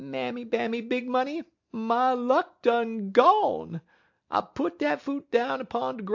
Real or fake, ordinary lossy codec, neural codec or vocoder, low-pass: real; AAC, 48 kbps; none; 7.2 kHz